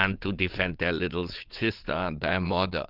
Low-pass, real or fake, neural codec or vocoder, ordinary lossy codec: 5.4 kHz; fake; vocoder, 22.05 kHz, 80 mel bands, WaveNeXt; Opus, 32 kbps